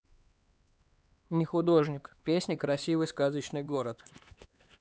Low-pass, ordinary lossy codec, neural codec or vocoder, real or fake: none; none; codec, 16 kHz, 2 kbps, X-Codec, HuBERT features, trained on LibriSpeech; fake